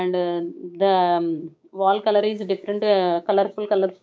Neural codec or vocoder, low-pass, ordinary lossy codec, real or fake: codec, 16 kHz, 6 kbps, DAC; none; none; fake